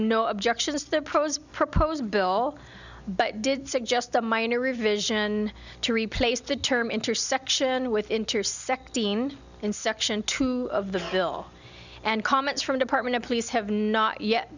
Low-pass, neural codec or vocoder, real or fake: 7.2 kHz; none; real